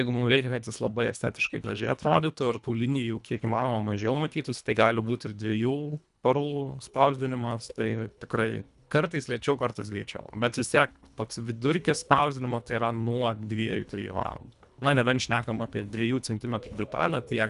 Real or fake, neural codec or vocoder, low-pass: fake; codec, 24 kHz, 1.5 kbps, HILCodec; 10.8 kHz